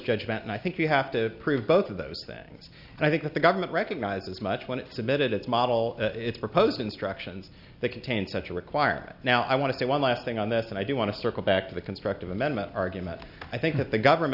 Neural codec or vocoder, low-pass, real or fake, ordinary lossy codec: none; 5.4 kHz; real; AAC, 48 kbps